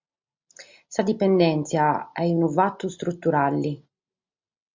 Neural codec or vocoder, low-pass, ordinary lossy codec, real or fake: none; 7.2 kHz; MP3, 64 kbps; real